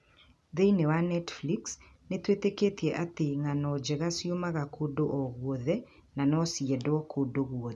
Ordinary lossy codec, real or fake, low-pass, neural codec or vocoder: none; real; none; none